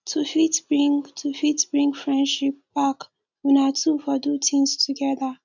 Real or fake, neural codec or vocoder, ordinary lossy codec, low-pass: real; none; none; 7.2 kHz